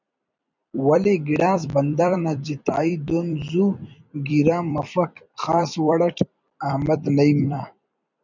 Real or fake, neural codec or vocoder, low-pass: fake; vocoder, 44.1 kHz, 128 mel bands every 256 samples, BigVGAN v2; 7.2 kHz